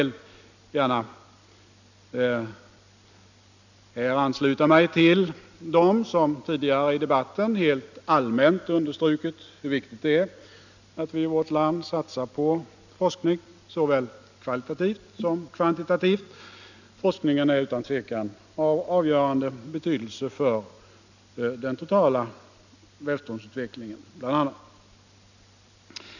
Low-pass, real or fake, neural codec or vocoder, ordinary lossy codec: 7.2 kHz; real; none; none